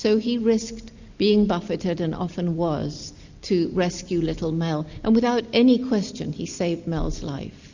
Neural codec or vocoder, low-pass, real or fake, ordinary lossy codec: none; 7.2 kHz; real; Opus, 64 kbps